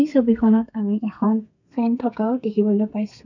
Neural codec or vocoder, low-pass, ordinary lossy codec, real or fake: codec, 32 kHz, 1.9 kbps, SNAC; 7.2 kHz; none; fake